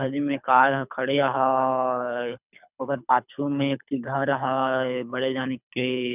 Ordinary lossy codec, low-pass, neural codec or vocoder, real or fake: none; 3.6 kHz; codec, 24 kHz, 3 kbps, HILCodec; fake